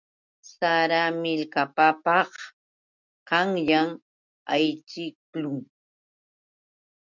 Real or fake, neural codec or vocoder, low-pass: real; none; 7.2 kHz